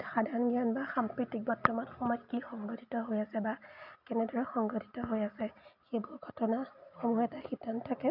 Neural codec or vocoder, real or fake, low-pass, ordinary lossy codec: none; real; 5.4 kHz; none